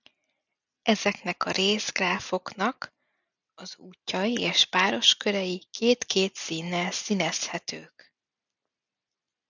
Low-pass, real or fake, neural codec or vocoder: 7.2 kHz; real; none